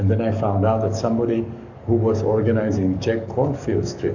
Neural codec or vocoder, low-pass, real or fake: codec, 44.1 kHz, 7.8 kbps, DAC; 7.2 kHz; fake